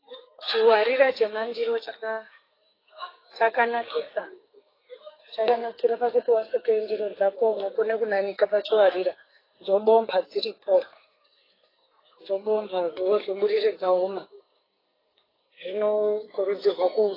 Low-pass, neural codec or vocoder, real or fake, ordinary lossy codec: 5.4 kHz; codec, 44.1 kHz, 2.6 kbps, SNAC; fake; AAC, 24 kbps